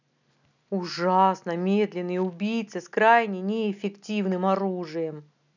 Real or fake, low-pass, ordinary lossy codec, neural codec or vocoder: real; 7.2 kHz; none; none